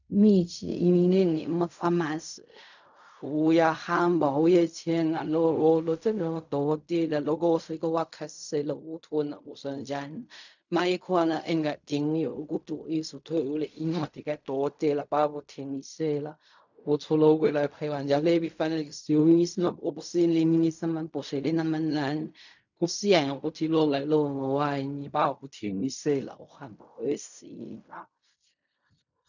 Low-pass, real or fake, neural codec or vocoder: 7.2 kHz; fake; codec, 16 kHz in and 24 kHz out, 0.4 kbps, LongCat-Audio-Codec, fine tuned four codebook decoder